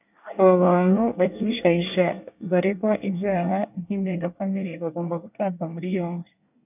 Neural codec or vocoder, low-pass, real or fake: codec, 24 kHz, 1 kbps, SNAC; 3.6 kHz; fake